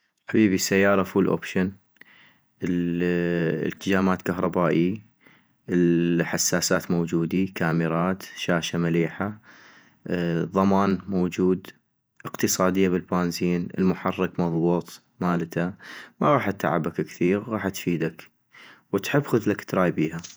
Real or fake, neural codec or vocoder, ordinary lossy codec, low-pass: fake; vocoder, 48 kHz, 128 mel bands, Vocos; none; none